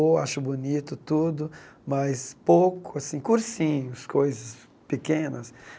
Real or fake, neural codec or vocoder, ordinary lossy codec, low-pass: real; none; none; none